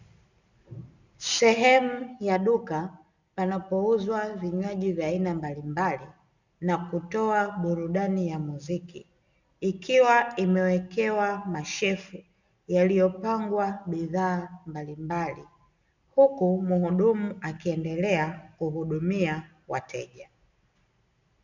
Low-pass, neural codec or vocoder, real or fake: 7.2 kHz; none; real